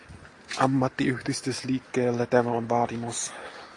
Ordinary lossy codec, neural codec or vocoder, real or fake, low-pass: AAC, 48 kbps; none; real; 10.8 kHz